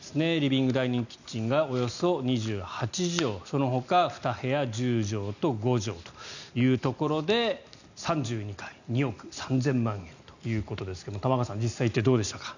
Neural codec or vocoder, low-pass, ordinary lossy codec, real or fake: none; 7.2 kHz; none; real